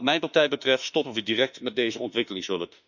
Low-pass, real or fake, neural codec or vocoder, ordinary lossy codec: 7.2 kHz; fake; autoencoder, 48 kHz, 32 numbers a frame, DAC-VAE, trained on Japanese speech; none